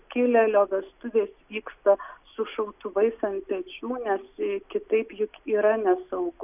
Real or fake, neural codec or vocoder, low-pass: real; none; 3.6 kHz